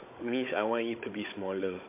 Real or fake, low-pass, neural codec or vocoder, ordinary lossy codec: fake; 3.6 kHz; codec, 16 kHz, 16 kbps, FunCodec, trained on Chinese and English, 50 frames a second; MP3, 24 kbps